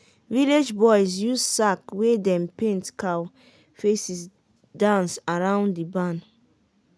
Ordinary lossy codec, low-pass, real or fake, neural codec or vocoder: none; none; real; none